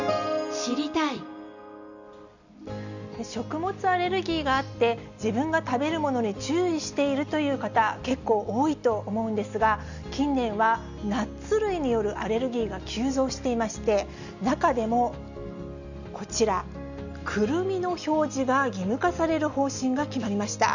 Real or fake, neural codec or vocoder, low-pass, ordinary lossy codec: real; none; 7.2 kHz; none